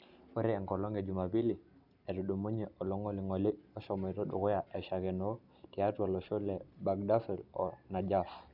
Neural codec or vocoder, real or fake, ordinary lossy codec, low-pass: none; real; none; 5.4 kHz